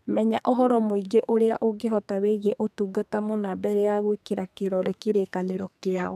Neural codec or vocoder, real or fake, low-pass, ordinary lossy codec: codec, 32 kHz, 1.9 kbps, SNAC; fake; 14.4 kHz; none